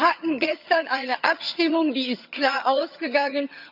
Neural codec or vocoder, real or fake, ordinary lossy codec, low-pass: vocoder, 22.05 kHz, 80 mel bands, HiFi-GAN; fake; none; 5.4 kHz